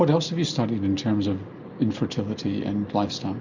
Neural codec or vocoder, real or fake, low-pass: none; real; 7.2 kHz